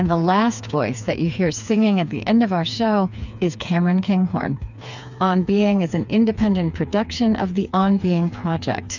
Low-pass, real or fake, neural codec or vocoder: 7.2 kHz; fake; codec, 16 kHz, 4 kbps, FreqCodec, smaller model